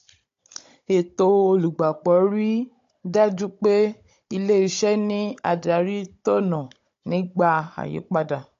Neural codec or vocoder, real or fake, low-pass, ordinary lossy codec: codec, 16 kHz, 16 kbps, FunCodec, trained on Chinese and English, 50 frames a second; fake; 7.2 kHz; AAC, 48 kbps